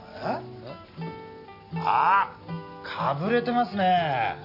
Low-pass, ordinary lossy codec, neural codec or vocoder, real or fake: 5.4 kHz; none; none; real